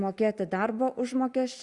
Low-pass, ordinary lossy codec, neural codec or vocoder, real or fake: 10.8 kHz; Opus, 64 kbps; none; real